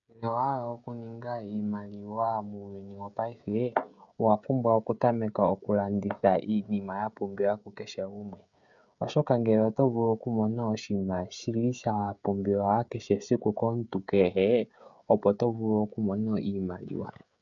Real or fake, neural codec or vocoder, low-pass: fake; codec, 16 kHz, 16 kbps, FreqCodec, smaller model; 7.2 kHz